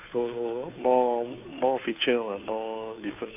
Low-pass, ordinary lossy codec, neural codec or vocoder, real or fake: 3.6 kHz; none; codec, 16 kHz, 2 kbps, FunCodec, trained on Chinese and English, 25 frames a second; fake